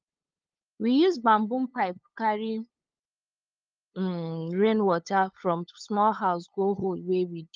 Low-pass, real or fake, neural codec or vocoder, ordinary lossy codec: 7.2 kHz; fake; codec, 16 kHz, 8 kbps, FunCodec, trained on LibriTTS, 25 frames a second; Opus, 24 kbps